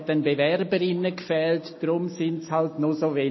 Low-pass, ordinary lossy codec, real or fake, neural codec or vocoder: 7.2 kHz; MP3, 24 kbps; real; none